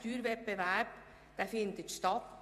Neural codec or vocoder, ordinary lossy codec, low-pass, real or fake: vocoder, 48 kHz, 128 mel bands, Vocos; AAC, 96 kbps; 14.4 kHz; fake